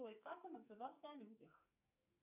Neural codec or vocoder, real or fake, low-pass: codec, 44.1 kHz, 2.6 kbps, SNAC; fake; 3.6 kHz